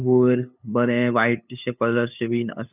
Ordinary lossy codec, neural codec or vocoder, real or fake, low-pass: none; codec, 16 kHz, 8 kbps, FunCodec, trained on LibriTTS, 25 frames a second; fake; 3.6 kHz